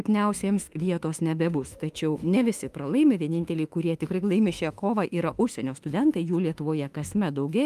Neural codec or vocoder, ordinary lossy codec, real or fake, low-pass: autoencoder, 48 kHz, 32 numbers a frame, DAC-VAE, trained on Japanese speech; Opus, 32 kbps; fake; 14.4 kHz